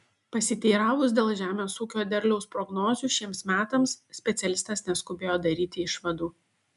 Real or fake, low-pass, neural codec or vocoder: real; 10.8 kHz; none